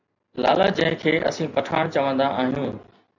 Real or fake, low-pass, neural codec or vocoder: real; 7.2 kHz; none